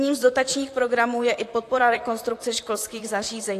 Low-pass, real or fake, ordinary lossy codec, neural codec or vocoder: 14.4 kHz; fake; AAC, 64 kbps; vocoder, 44.1 kHz, 128 mel bands, Pupu-Vocoder